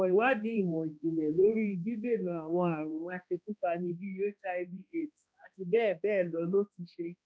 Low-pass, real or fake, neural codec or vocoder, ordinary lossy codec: none; fake; codec, 16 kHz, 1 kbps, X-Codec, HuBERT features, trained on balanced general audio; none